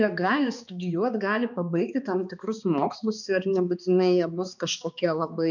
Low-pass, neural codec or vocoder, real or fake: 7.2 kHz; codec, 16 kHz, 2 kbps, X-Codec, HuBERT features, trained on balanced general audio; fake